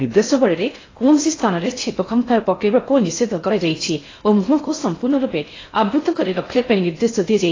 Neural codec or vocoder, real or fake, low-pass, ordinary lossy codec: codec, 16 kHz in and 24 kHz out, 0.6 kbps, FocalCodec, streaming, 4096 codes; fake; 7.2 kHz; AAC, 32 kbps